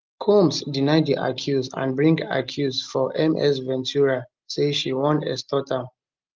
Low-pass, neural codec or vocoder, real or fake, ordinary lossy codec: 7.2 kHz; none; real; Opus, 32 kbps